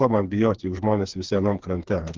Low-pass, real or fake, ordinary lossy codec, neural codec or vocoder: 7.2 kHz; fake; Opus, 16 kbps; codec, 16 kHz, 4 kbps, FreqCodec, smaller model